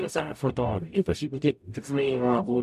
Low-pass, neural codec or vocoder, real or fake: 14.4 kHz; codec, 44.1 kHz, 0.9 kbps, DAC; fake